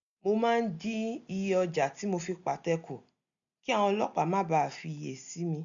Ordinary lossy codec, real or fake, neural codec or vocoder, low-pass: none; real; none; 7.2 kHz